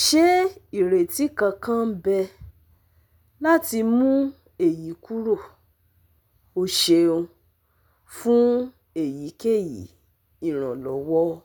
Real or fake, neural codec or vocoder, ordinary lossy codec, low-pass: real; none; none; none